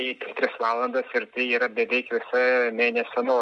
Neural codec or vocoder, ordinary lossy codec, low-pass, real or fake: none; MP3, 96 kbps; 10.8 kHz; real